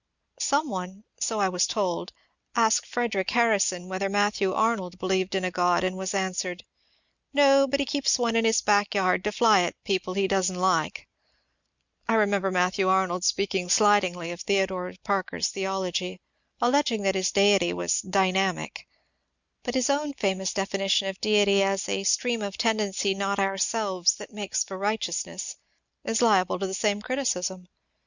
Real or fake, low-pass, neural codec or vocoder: real; 7.2 kHz; none